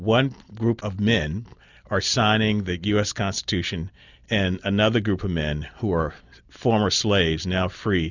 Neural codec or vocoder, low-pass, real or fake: none; 7.2 kHz; real